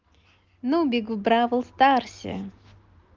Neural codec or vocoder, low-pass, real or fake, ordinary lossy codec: none; 7.2 kHz; real; Opus, 24 kbps